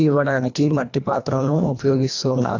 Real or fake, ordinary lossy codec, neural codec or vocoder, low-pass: fake; AAC, 48 kbps; codec, 24 kHz, 1.5 kbps, HILCodec; 7.2 kHz